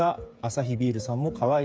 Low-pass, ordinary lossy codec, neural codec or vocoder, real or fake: none; none; codec, 16 kHz, 8 kbps, FreqCodec, smaller model; fake